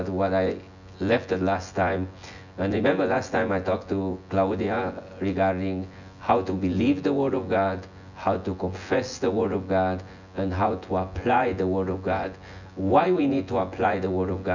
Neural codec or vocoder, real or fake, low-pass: vocoder, 24 kHz, 100 mel bands, Vocos; fake; 7.2 kHz